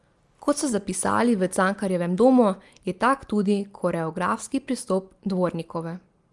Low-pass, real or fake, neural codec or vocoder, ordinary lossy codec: 10.8 kHz; real; none; Opus, 32 kbps